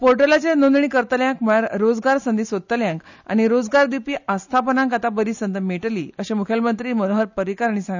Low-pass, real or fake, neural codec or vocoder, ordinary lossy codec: 7.2 kHz; real; none; none